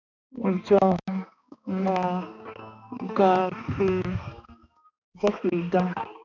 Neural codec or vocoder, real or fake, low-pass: codec, 16 kHz, 2 kbps, X-Codec, HuBERT features, trained on balanced general audio; fake; 7.2 kHz